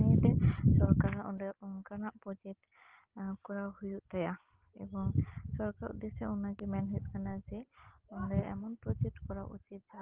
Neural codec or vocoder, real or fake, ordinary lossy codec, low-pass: none; real; Opus, 16 kbps; 3.6 kHz